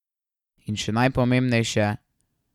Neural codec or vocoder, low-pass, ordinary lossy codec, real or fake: none; 19.8 kHz; none; real